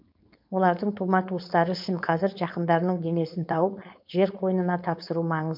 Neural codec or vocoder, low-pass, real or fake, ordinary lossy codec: codec, 16 kHz, 4.8 kbps, FACodec; 5.4 kHz; fake; MP3, 48 kbps